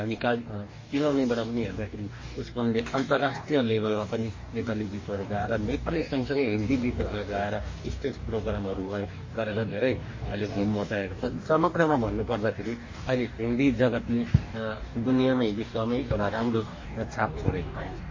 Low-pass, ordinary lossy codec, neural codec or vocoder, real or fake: 7.2 kHz; MP3, 32 kbps; codec, 44.1 kHz, 2.6 kbps, DAC; fake